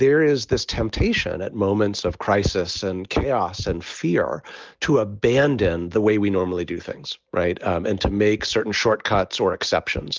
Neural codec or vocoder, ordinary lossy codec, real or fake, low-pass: none; Opus, 24 kbps; real; 7.2 kHz